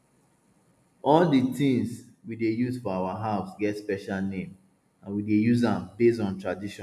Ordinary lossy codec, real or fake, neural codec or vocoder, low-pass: none; real; none; 14.4 kHz